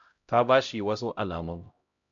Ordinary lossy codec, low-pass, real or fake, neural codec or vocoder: MP3, 64 kbps; 7.2 kHz; fake; codec, 16 kHz, 0.5 kbps, X-Codec, HuBERT features, trained on LibriSpeech